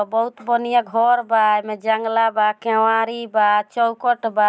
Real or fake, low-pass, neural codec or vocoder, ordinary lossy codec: real; none; none; none